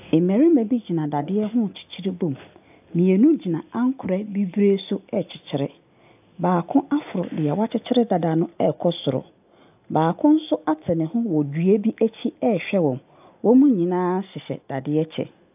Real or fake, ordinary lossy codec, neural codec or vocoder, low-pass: real; AAC, 32 kbps; none; 3.6 kHz